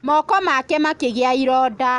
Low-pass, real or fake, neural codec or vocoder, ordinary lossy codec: 10.8 kHz; real; none; Opus, 64 kbps